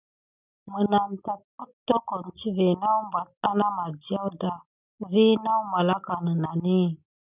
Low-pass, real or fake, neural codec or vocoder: 3.6 kHz; real; none